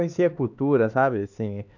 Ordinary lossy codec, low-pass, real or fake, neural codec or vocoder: none; 7.2 kHz; fake; codec, 16 kHz, 2 kbps, X-Codec, HuBERT features, trained on LibriSpeech